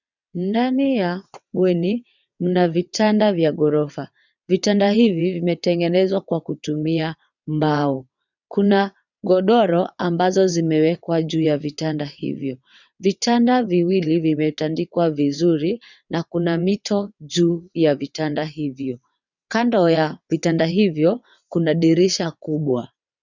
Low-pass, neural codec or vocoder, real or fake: 7.2 kHz; vocoder, 22.05 kHz, 80 mel bands, WaveNeXt; fake